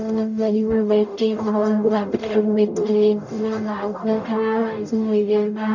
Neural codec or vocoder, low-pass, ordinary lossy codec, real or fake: codec, 44.1 kHz, 0.9 kbps, DAC; 7.2 kHz; none; fake